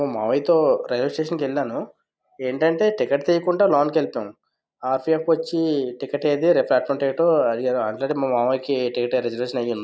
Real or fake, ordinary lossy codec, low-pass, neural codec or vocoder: real; none; none; none